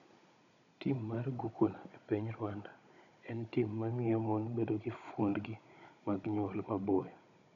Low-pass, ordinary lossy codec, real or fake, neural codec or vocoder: 7.2 kHz; none; fake; codec, 16 kHz, 16 kbps, FunCodec, trained on Chinese and English, 50 frames a second